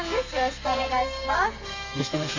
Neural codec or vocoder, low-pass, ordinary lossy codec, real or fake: codec, 32 kHz, 1.9 kbps, SNAC; 7.2 kHz; AAC, 48 kbps; fake